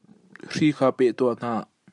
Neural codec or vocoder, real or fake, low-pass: none; real; 10.8 kHz